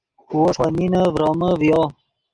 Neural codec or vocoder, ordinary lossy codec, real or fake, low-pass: none; Opus, 32 kbps; real; 9.9 kHz